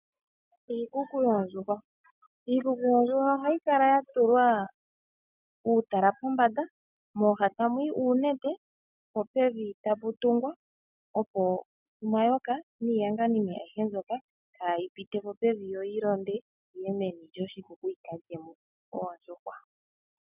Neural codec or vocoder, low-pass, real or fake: none; 3.6 kHz; real